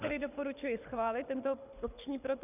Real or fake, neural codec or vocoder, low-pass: fake; codec, 24 kHz, 6 kbps, HILCodec; 3.6 kHz